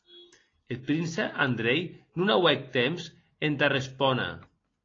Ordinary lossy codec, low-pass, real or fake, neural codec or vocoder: AAC, 32 kbps; 7.2 kHz; real; none